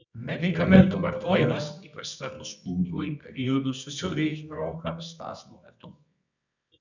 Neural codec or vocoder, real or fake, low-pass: codec, 24 kHz, 0.9 kbps, WavTokenizer, medium music audio release; fake; 7.2 kHz